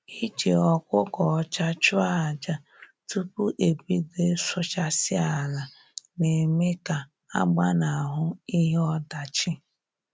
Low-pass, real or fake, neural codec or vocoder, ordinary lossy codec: none; real; none; none